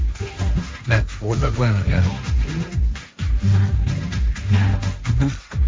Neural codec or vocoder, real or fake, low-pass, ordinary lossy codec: codec, 16 kHz, 1.1 kbps, Voila-Tokenizer; fake; none; none